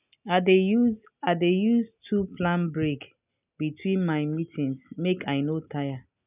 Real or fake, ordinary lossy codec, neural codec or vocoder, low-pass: real; none; none; 3.6 kHz